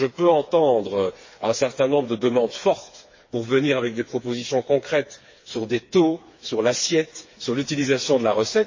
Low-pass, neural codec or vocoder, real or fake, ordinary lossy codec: 7.2 kHz; codec, 16 kHz, 4 kbps, FreqCodec, smaller model; fake; MP3, 32 kbps